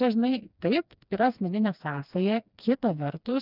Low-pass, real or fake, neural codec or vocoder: 5.4 kHz; fake; codec, 16 kHz, 2 kbps, FreqCodec, smaller model